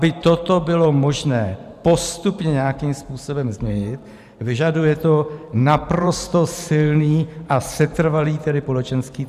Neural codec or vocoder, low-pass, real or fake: vocoder, 44.1 kHz, 128 mel bands every 512 samples, BigVGAN v2; 14.4 kHz; fake